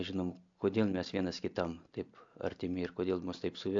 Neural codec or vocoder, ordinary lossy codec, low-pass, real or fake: none; AAC, 64 kbps; 7.2 kHz; real